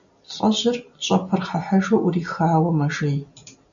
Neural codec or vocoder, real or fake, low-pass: none; real; 7.2 kHz